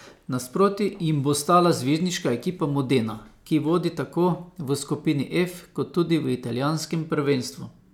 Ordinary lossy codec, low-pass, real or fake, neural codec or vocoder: none; 19.8 kHz; real; none